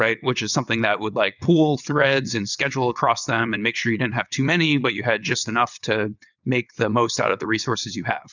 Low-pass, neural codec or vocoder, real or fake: 7.2 kHz; vocoder, 22.05 kHz, 80 mel bands, WaveNeXt; fake